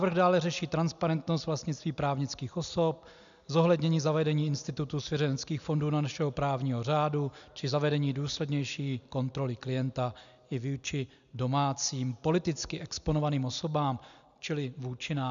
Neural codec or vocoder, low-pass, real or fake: none; 7.2 kHz; real